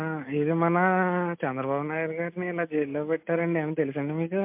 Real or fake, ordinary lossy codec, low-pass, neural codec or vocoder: real; MP3, 32 kbps; 3.6 kHz; none